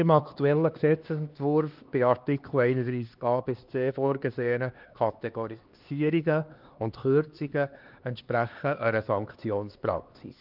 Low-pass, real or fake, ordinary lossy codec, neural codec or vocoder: 5.4 kHz; fake; Opus, 32 kbps; codec, 16 kHz, 2 kbps, X-Codec, HuBERT features, trained on LibriSpeech